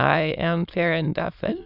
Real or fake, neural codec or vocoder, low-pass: fake; autoencoder, 22.05 kHz, a latent of 192 numbers a frame, VITS, trained on many speakers; 5.4 kHz